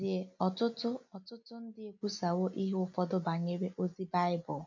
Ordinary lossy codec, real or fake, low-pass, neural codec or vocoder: MP3, 48 kbps; real; 7.2 kHz; none